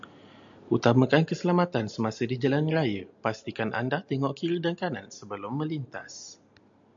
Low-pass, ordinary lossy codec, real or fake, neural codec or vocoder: 7.2 kHz; AAC, 64 kbps; real; none